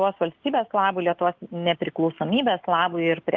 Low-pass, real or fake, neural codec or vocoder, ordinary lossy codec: 7.2 kHz; real; none; Opus, 32 kbps